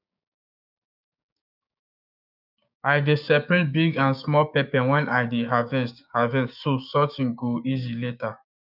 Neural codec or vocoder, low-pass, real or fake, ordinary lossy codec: codec, 16 kHz, 6 kbps, DAC; 5.4 kHz; fake; none